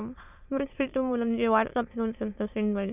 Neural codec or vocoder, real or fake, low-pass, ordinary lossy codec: autoencoder, 22.05 kHz, a latent of 192 numbers a frame, VITS, trained on many speakers; fake; 3.6 kHz; none